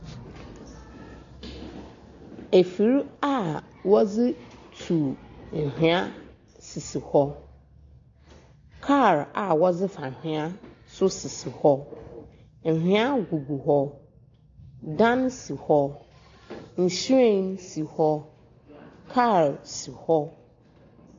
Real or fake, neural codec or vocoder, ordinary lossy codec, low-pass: real; none; AAC, 48 kbps; 7.2 kHz